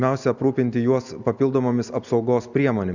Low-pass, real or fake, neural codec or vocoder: 7.2 kHz; real; none